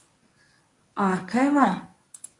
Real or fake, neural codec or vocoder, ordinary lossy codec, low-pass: fake; codec, 24 kHz, 0.9 kbps, WavTokenizer, medium speech release version 1; AAC, 64 kbps; 10.8 kHz